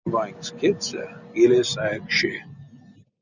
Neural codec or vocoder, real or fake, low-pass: none; real; 7.2 kHz